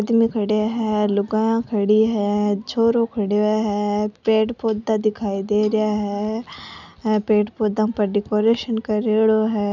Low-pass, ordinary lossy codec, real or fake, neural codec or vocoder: 7.2 kHz; none; real; none